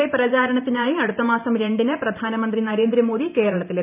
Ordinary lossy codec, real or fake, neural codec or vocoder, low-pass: none; fake; vocoder, 44.1 kHz, 128 mel bands every 512 samples, BigVGAN v2; 3.6 kHz